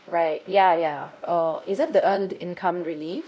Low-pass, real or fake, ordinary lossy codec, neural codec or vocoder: none; fake; none; codec, 16 kHz, 0.5 kbps, X-Codec, WavLM features, trained on Multilingual LibriSpeech